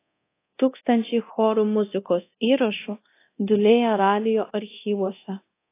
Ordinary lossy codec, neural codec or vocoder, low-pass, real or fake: AAC, 24 kbps; codec, 24 kHz, 0.9 kbps, DualCodec; 3.6 kHz; fake